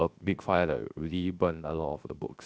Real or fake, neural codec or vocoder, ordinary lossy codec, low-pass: fake; codec, 16 kHz, 0.7 kbps, FocalCodec; none; none